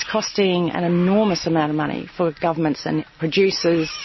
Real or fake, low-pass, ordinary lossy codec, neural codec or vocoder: real; 7.2 kHz; MP3, 24 kbps; none